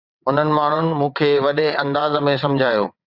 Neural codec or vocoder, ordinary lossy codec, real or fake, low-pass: vocoder, 22.05 kHz, 80 mel bands, WaveNeXt; Opus, 64 kbps; fake; 5.4 kHz